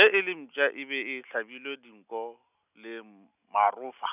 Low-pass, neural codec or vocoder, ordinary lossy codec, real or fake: 3.6 kHz; none; none; real